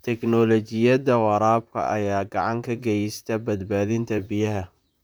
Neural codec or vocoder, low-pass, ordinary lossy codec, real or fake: vocoder, 44.1 kHz, 128 mel bands, Pupu-Vocoder; none; none; fake